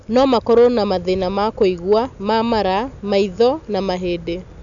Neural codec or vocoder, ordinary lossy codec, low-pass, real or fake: none; none; 7.2 kHz; real